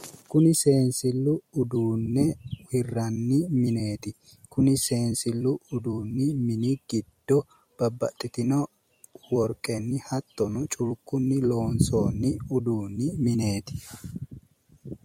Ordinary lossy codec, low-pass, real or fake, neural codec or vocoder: MP3, 64 kbps; 19.8 kHz; fake; vocoder, 44.1 kHz, 128 mel bands every 256 samples, BigVGAN v2